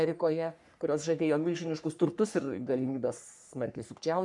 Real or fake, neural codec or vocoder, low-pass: fake; codec, 44.1 kHz, 3.4 kbps, Pupu-Codec; 10.8 kHz